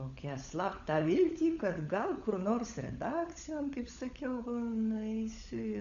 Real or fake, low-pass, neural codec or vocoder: fake; 7.2 kHz; codec, 16 kHz, 16 kbps, FunCodec, trained on LibriTTS, 50 frames a second